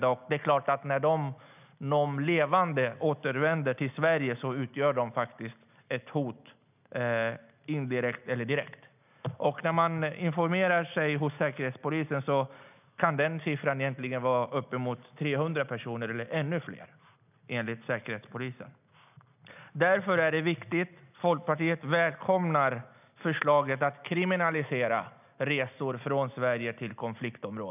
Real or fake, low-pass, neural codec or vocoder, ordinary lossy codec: real; 3.6 kHz; none; none